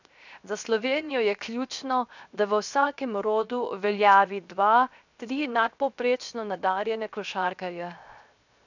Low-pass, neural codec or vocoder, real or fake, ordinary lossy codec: 7.2 kHz; codec, 16 kHz, 0.7 kbps, FocalCodec; fake; none